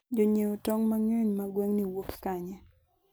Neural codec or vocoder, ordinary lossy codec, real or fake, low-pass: none; none; real; none